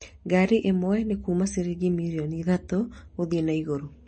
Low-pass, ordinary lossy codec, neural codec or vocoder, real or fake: 9.9 kHz; MP3, 32 kbps; none; real